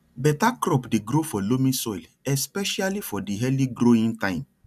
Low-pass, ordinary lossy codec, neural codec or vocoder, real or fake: 14.4 kHz; none; none; real